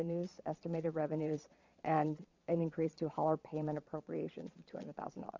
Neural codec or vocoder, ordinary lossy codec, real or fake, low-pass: vocoder, 22.05 kHz, 80 mel bands, Vocos; MP3, 48 kbps; fake; 7.2 kHz